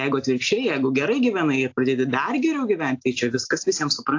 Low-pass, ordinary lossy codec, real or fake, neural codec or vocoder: 7.2 kHz; AAC, 48 kbps; real; none